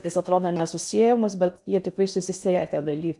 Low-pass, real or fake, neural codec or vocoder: 10.8 kHz; fake; codec, 16 kHz in and 24 kHz out, 0.6 kbps, FocalCodec, streaming, 4096 codes